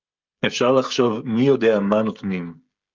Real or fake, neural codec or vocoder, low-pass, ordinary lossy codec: fake; codec, 16 kHz, 16 kbps, FreqCodec, smaller model; 7.2 kHz; Opus, 24 kbps